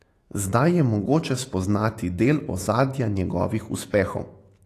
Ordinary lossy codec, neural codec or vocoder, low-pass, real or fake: AAC, 48 kbps; autoencoder, 48 kHz, 128 numbers a frame, DAC-VAE, trained on Japanese speech; 14.4 kHz; fake